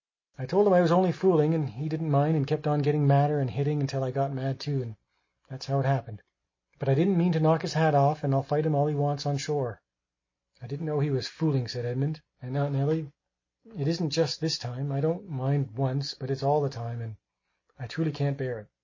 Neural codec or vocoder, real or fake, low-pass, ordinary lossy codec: none; real; 7.2 kHz; MP3, 32 kbps